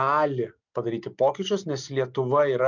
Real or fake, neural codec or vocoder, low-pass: real; none; 7.2 kHz